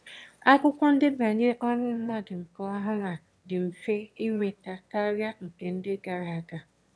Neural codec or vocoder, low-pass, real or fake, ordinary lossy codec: autoencoder, 22.05 kHz, a latent of 192 numbers a frame, VITS, trained on one speaker; none; fake; none